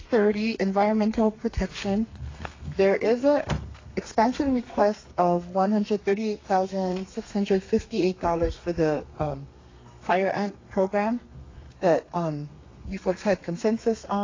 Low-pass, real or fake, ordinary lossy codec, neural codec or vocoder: 7.2 kHz; fake; AAC, 32 kbps; codec, 32 kHz, 1.9 kbps, SNAC